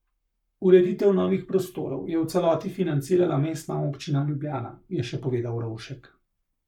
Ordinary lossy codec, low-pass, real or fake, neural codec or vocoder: none; 19.8 kHz; fake; codec, 44.1 kHz, 7.8 kbps, Pupu-Codec